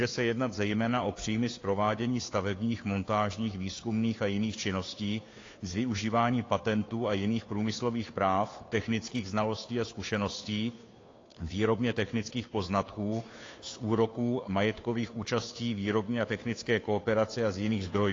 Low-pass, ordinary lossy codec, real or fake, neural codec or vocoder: 7.2 kHz; AAC, 32 kbps; fake; codec, 16 kHz, 2 kbps, FunCodec, trained on Chinese and English, 25 frames a second